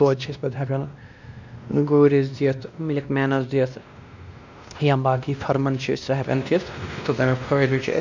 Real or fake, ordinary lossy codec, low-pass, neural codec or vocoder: fake; none; 7.2 kHz; codec, 16 kHz, 1 kbps, X-Codec, WavLM features, trained on Multilingual LibriSpeech